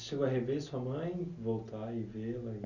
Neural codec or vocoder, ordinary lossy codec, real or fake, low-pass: none; none; real; 7.2 kHz